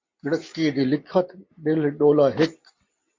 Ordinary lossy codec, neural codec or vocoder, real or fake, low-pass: MP3, 48 kbps; none; real; 7.2 kHz